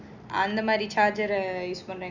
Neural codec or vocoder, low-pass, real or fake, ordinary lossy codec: none; 7.2 kHz; real; none